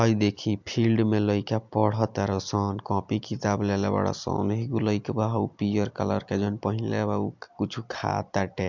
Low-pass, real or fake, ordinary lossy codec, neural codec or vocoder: 7.2 kHz; real; MP3, 64 kbps; none